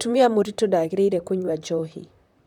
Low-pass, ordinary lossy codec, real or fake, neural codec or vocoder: 19.8 kHz; none; fake; vocoder, 44.1 kHz, 128 mel bands, Pupu-Vocoder